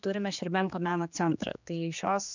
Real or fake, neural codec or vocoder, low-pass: fake; codec, 16 kHz, 2 kbps, X-Codec, HuBERT features, trained on general audio; 7.2 kHz